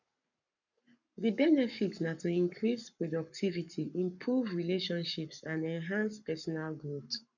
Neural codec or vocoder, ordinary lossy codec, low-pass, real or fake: codec, 44.1 kHz, 7.8 kbps, Pupu-Codec; none; 7.2 kHz; fake